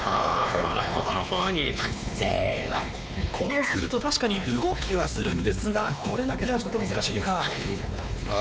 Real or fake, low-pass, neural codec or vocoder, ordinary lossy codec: fake; none; codec, 16 kHz, 2 kbps, X-Codec, WavLM features, trained on Multilingual LibriSpeech; none